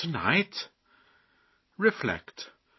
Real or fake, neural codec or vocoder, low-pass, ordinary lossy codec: real; none; 7.2 kHz; MP3, 24 kbps